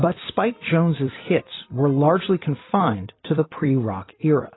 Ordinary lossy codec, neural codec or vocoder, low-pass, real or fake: AAC, 16 kbps; vocoder, 44.1 kHz, 128 mel bands every 256 samples, BigVGAN v2; 7.2 kHz; fake